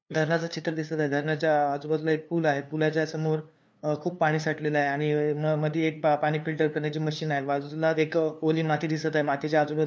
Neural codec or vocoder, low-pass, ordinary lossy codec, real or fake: codec, 16 kHz, 2 kbps, FunCodec, trained on LibriTTS, 25 frames a second; none; none; fake